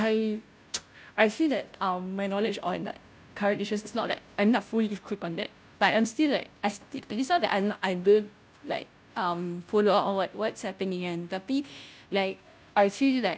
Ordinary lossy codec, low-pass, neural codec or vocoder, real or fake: none; none; codec, 16 kHz, 0.5 kbps, FunCodec, trained on Chinese and English, 25 frames a second; fake